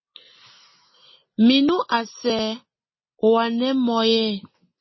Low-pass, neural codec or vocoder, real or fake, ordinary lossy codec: 7.2 kHz; none; real; MP3, 24 kbps